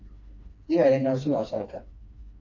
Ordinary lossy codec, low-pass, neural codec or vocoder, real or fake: AAC, 48 kbps; 7.2 kHz; codec, 16 kHz, 2 kbps, FreqCodec, smaller model; fake